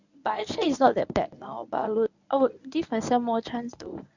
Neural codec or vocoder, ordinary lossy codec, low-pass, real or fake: codec, 24 kHz, 0.9 kbps, WavTokenizer, medium speech release version 1; none; 7.2 kHz; fake